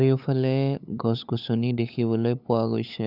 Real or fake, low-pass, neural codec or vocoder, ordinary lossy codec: fake; 5.4 kHz; codec, 16 kHz, 8 kbps, FunCodec, trained on LibriTTS, 25 frames a second; none